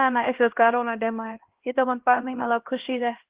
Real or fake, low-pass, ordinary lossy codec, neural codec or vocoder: fake; 3.6 kHz; Opus, 16 kbps; codec, 16 kHz, 1 kbps, X-Codec, HuBERT features, trained on LibriSpeech